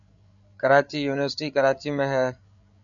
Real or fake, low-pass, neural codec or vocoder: fake; 7.2 kHz; codec, 16 kHz, 8 kbps, FreqCodec, larger model